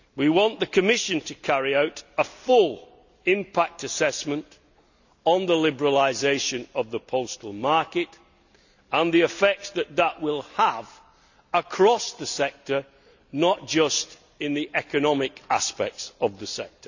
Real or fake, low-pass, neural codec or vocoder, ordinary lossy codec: real; 7.2 kHz; none; none